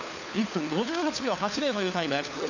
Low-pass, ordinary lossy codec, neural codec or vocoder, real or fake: 7.2 kHz; none; codec, 16 kHz, 4 kbps, FunCodec, trained on LibriTTS, 50 frames a second; fake